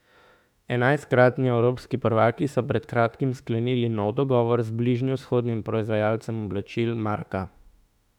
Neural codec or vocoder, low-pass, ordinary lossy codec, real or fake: autoencoder, 48 kHz, 32 numbers a frame, DAC-VAE, trained on Japanese speech; 19.8 kHz; none; fake